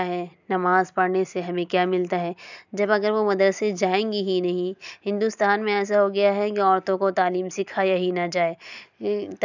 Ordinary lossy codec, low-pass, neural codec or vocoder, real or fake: none; 7.2 kHz; none; real